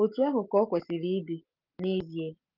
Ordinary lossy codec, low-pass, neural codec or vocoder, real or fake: Opus, 32 kbps; 5.4 kHz; none; real